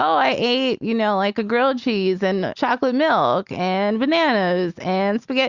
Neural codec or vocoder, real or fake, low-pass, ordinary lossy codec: none; real; 7.2 kHz; Opus, 64 kbps